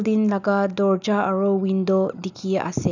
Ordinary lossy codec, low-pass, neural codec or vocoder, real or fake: none; 7.2 kHz; none; real